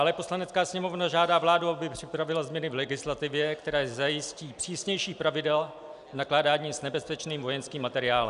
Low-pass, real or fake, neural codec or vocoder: 10.8 kHz; real; none